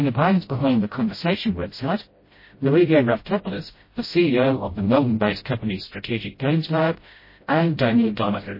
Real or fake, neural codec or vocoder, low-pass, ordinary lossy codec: fake; codec, 16 kHz, 0.5 kbps, FreqCodec, smaller model; 5.4 kHz; MP3, 24 kbps